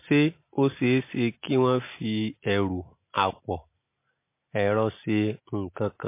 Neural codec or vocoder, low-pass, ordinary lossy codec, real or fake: none; 3.6 kHz; MP3, 24 kbps; real